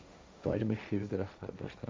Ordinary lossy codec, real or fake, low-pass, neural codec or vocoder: none; fake; none; codec, 16 kHz, 1.1 kbps, Voila-Tokenizer